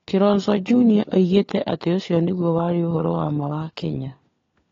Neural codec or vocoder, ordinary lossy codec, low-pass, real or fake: codec, 16 kHz, 4 kbps, FunCodec, trained on LibriTTS, 50 frames a second; AAC, 32 kbps; 7.2 kHz; fake